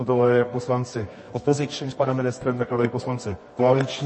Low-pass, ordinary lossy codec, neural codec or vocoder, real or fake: 9.9 kHz; MP3, 32 kbps; codec, 24 kHz, 0.9 kbps, WavTokenizer, medium music audio release; fake